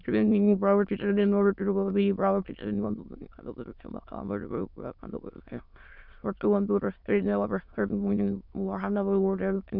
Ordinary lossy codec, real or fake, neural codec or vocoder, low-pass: none; fake; autoencoder, 22.05 kHz, a latent of 192 numbers a frame, VITS, trained on many speakers; 5.4 kHz